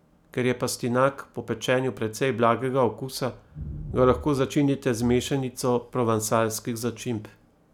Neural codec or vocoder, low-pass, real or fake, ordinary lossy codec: none; 19.8 kHz; real; none